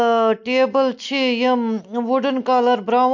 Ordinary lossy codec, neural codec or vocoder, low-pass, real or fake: MP3, 48 kbps; none; 7.2 kHz; real